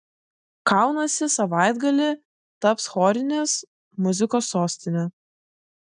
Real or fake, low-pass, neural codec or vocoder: real; 9.9 kHz; none